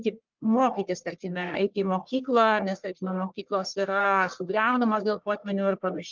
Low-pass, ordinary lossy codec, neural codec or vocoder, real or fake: 7.2 kHz; Opus, 32 kbps; codec, 44.1 kHz, 1.7 kbps, Pupu-Codec; fake